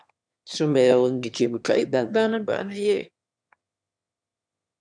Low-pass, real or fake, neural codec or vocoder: 9.9 kHz; fake; autoencoder, 22.05 kHz, a latent of 192 numbers a frame, VITS, trained on one speaker